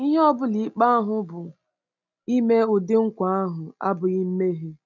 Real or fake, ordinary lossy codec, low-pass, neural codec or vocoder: real; none; 7.2 kHz; none